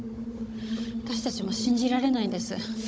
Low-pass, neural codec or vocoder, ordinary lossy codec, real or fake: none; codec, 16 kHz, 16 kbps, FunCodec, trained on Chinese and English, 50 frames a second; none; fake